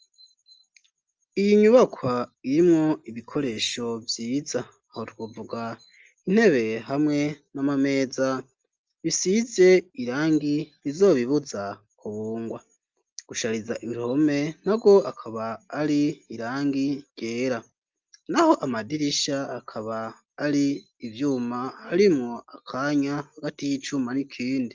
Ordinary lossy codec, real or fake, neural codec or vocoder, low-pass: Opus, 24 kbps; real; none; 7.2 kHz